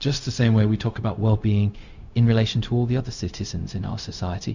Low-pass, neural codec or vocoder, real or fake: 7.2 kHz; codec, 16 kHz, 0.4 kbps, LongCat-Audio-Codec; fake